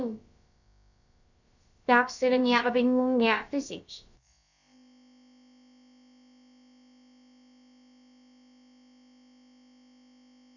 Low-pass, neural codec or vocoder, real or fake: 7.2 kHz; codec, 16 kHz, about 1 kbps, DyCAST, with the encoder's durations; fake